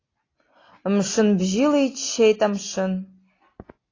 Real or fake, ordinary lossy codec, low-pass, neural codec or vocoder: real; AAC, 32 kbps; 7.2 kHz; none